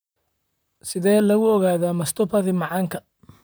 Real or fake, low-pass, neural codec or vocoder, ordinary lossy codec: fake; none; vocoder, 44.1 kHz, 128 mel bands, Pupu-Vocoder; none